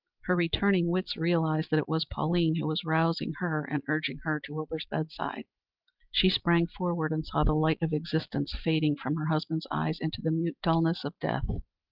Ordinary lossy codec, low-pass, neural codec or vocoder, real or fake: Opus, 24 kbps; 5.4 kHz; none; real